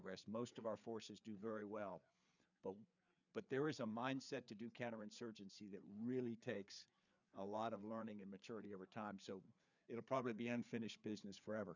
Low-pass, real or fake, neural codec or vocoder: 7.2 kHz; fake; codec, 16 kHz, 4 kbps, FreqCodec, larger model